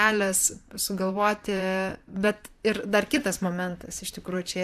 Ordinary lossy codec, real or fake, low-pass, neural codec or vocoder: AAC, 96 kbps; fake; 14.4 kHz; vocoder, 44.1 kHz, 128 mel bands, Pupu-Vocoder